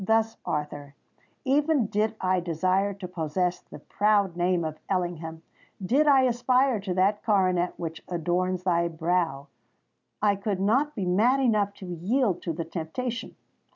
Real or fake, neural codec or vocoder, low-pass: real; none; 7.2 kHz